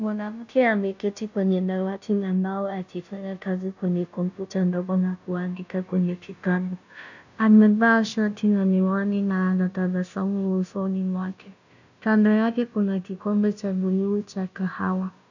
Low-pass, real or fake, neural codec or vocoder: 7.2 kHz; fake; codec, 16 kHz, 0.5 kbps, FunCodec, trained on Chinese and English, 25 frames a second